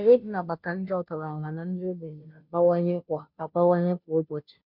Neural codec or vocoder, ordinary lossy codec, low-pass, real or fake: codec, 16 kHz, 0.5 kbps, FunCodec, trained on Chinese and English, 25 frames a second; MP3, 48 kbps; 5.4 kHz; fake